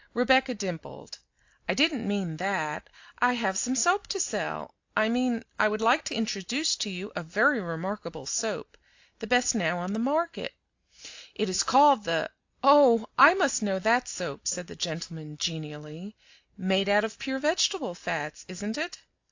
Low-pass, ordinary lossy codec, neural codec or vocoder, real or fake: 7.2 kHz; AAC, 48 kbps; none; real